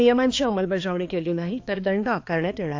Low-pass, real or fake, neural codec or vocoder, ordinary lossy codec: 7.2 kHz; fake; codec, 16 kHz, 2 kbps, X-Codec, HuBERT features, trained on balanced general audio; AAC, 48 kbps